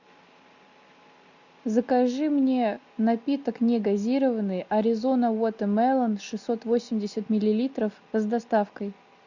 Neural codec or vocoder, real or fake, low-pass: none; real; 7.2 kHz